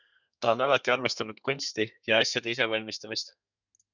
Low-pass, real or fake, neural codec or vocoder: 7.2 kHz; fake; codec, 32 kHz, 1.9 kbps, SNAC